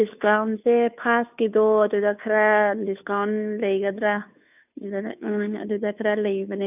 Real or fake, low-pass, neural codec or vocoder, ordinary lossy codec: fake; 3.6 kHz; codec, 16 kHz, 2 kbps, FunCodec, trained on Chinese and English, 25 frames a second; none